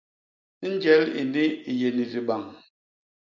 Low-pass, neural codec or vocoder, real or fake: 7.2 kHz; none; real